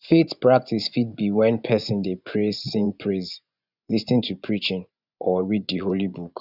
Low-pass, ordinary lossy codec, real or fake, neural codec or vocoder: 5.4 kHz; none; fake; vocoder, 24 kHz, 100 mel bands, Vocos